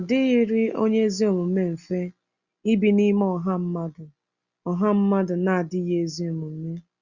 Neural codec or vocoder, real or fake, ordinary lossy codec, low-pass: none; real; Opus, 64 kbps; 7.2 kHz